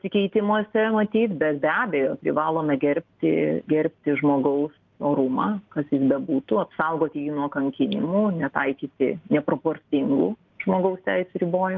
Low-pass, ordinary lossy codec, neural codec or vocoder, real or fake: 7.2 kHz; Opus, 24 kbps; none; real